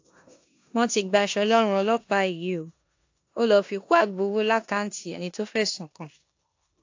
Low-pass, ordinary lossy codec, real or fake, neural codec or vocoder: 7.2 kHz; AAC, 48 kbps; fake; codec, 16 kHz in and 24 kHz out, 0.9 kbps, LongCat-Audio-Codec, four codebook decoder